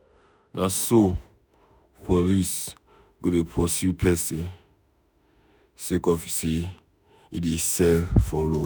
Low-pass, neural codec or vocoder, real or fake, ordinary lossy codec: none; autoencoder, 48 kHz, 32 numbers a frame, DAC-VAE, trained on Japanese speech; fake; none